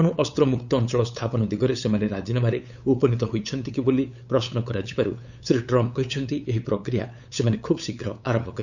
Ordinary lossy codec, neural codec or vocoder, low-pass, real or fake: none; codec, 16 kHz, 8 kbps, FunCodec, trained on LibriTTS, 25 frames a second; 7.2 kHz; fake